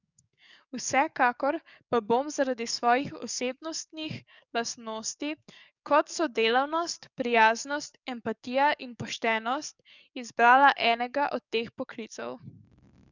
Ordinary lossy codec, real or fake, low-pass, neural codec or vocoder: none; fake; 7.2 kHz; codec, 44.1 kHz, 7.8 kbps, DAC